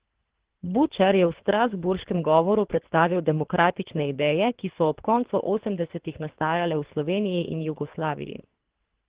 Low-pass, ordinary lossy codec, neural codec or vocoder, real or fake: 3.6 kHz; Opus, 16 kbps; codec, 24 kHz, 3 kbps, HILCodec; fake